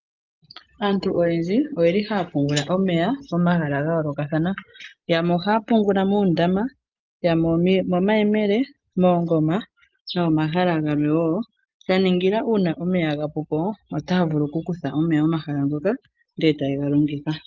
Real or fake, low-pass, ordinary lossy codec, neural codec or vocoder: real; 7.2 kHz; Opus, 24 kbps; none